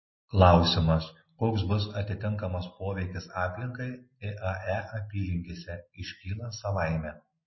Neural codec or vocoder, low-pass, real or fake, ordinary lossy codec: none; 7.2 kHz; real; MP3, 24 kbps